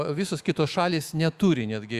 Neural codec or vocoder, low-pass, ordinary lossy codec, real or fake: autoencoder, 48 kHz, 128 numbers a frame, DAC-VAE, trained on Japanese speech; 14.4 kHz; Opus, 64 kbps; fake